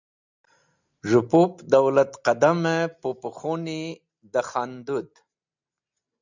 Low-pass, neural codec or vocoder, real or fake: 7.2 kHz; none; real